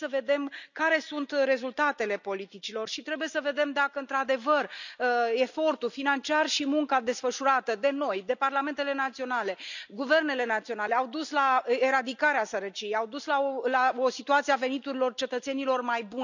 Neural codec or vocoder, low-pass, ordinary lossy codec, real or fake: none; 7.2 kHz; none; real